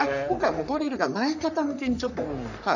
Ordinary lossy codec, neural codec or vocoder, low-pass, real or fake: none; codec, 44.1 kHz, 3.4 kbps, Pupu-Codec; 7.2 kHz; fake